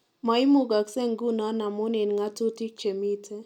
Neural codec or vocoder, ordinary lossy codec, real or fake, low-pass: none; none; real; 19.8 kHz